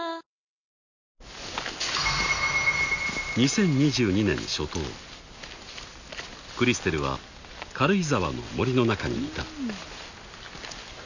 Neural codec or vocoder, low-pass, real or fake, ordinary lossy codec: none; 7.2 kHz; real; none